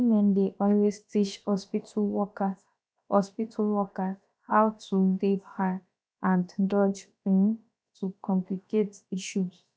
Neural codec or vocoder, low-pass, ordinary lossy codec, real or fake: codec, 16 kHz, about 1 kbps, DyCAST, with the encoder's durations; none; none; fake